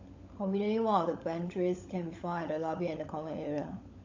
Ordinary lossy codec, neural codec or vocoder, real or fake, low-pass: AAC, 48 kbps; codec, 16 kHz, 16 kbps, FunCodec, trained on LibriTTS, 50 frames a second; fake; 7.2 kHz